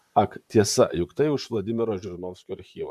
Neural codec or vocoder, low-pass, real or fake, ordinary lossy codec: vocoder, 44.1 kHz, 128 mel bands, Pupu-Vocoder; 14.4 kHz; fake; MP3, 96 kbps